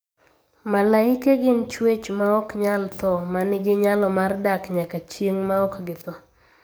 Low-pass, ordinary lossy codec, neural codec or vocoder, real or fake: none; none; codec, 44.1 kHz, 7.8 kbps, DAC; fake